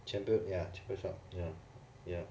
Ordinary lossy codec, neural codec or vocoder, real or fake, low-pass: none; none; real; none